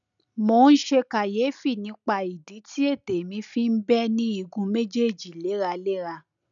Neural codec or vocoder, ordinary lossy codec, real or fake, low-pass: none; none; real; 7.2 kHz